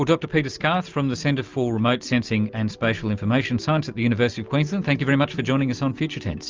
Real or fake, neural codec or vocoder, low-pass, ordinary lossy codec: real; none; 7.2 kHz; Opus, 24 kbps